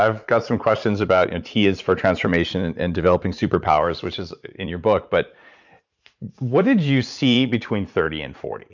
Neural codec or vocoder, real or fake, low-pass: vocoder, 44.1 kHz, 80 mel bands, Vocos; fake; 7.2 kHz